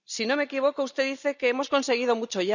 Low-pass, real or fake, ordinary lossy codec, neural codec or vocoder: 7.2 kHz; real; none; none